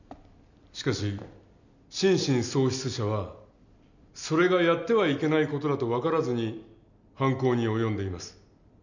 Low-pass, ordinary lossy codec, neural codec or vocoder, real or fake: 7.2 kHz; none; none; real